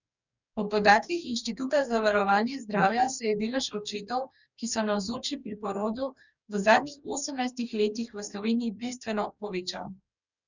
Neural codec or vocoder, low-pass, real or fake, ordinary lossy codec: codec, 44.1 kHz, 2.6 kbps, DAC; 7.2 kHz; fake; none